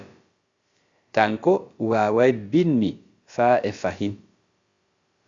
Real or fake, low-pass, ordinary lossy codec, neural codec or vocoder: fake; 7.2 kHz; Opus, 64 kbps; codec, 16 kHz, about 1 kbps, DyCAST, with the encoder's durations